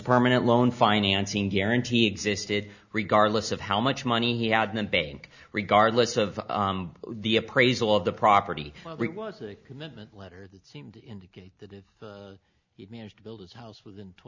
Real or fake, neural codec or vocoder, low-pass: real; none; 7.2 kHz